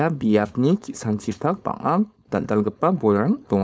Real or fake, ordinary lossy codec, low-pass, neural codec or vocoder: fake; none; none; codec, 16 kHz, 4.8 kbps, FACodec